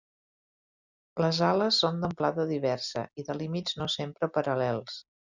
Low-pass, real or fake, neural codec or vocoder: 7.2 kHz; real; none